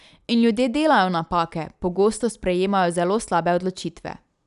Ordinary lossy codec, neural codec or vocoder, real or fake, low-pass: none; none; real; 10.8 kHz